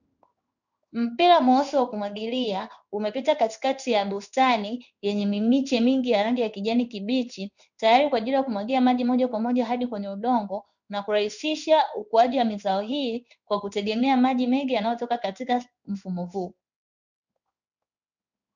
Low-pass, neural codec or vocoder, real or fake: 7.2 kHz; codec, 16 kHz in and 24 kHz out, 1 kbps, XY-Tokenizer; fake